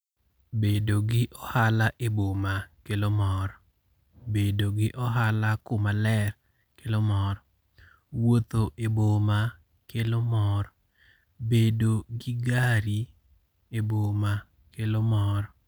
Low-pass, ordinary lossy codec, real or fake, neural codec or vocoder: none; none; real; none